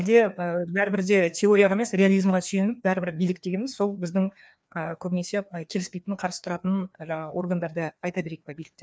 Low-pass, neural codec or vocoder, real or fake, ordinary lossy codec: none; codec, 16 kHz, 2 kbps, FreqCodec, larger model; fake; none